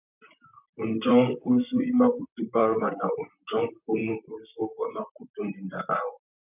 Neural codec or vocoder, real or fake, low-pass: vocoder, 44.1 kHz, 128 mel bands, Pupu-Vocoder; fake; 3.6 kHz